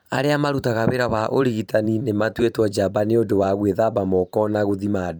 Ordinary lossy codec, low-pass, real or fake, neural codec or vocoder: none; none; fake; vocoder, 44.1 kHz, 128 mel bands every 512 samples, BigVGAN v2